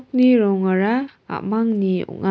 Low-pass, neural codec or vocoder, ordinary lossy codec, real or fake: none; none; none; real